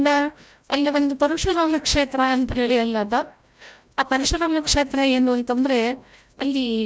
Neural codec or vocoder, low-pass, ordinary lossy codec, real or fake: codec, 16 kHz, 0.5 kbps, FreqCodec, larger model; none; none; fake